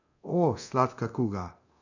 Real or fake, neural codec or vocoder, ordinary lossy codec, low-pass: fake; codec, 24 kHz, 0.9 kbps, DualCodec; none; 7.2 kHz